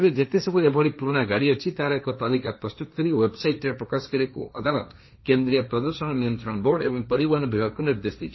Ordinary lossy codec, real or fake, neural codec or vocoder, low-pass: MP3, 24 kbps; fake; codec, 16 kHz, 1.1 kbps, Voila-Tokenizer; 7.2 kHz